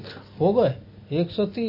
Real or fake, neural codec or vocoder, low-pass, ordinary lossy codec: real; none; 5.4 kHz; MP3, 24 kbps